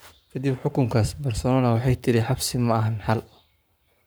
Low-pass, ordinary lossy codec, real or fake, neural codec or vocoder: none; none; fake; codec, 44.1 kHz, 7.8 kbps, Pupu-Codec